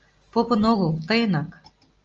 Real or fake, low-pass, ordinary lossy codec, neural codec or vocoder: real; 7.2 kHz; Opus, 24 kbps; none